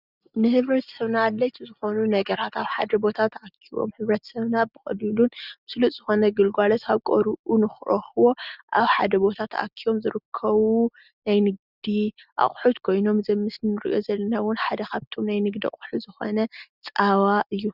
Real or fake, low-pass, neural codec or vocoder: real; 5.4 kHz; none